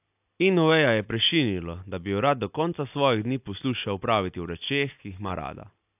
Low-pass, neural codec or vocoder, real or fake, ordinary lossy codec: 3.6 kHz; none; real; AAC, 32 kbps